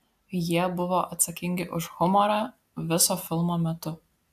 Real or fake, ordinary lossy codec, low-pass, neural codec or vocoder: real; AAC, 96 kbps; 14.4 kHz; none